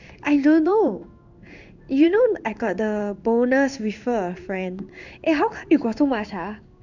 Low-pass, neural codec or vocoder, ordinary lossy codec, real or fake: 7.2 kHz; codec, 16 kHz in and 24 kHz out, 1 kbps, XY-Tokenizer; none; fake